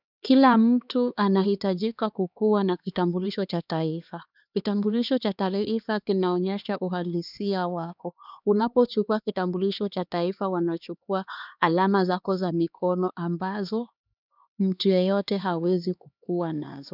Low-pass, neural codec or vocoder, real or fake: 5.4 kHz; codec, 16 kHz, 2 kbps, X-Codec, HuBERT features, trained on LibriSpeech; fake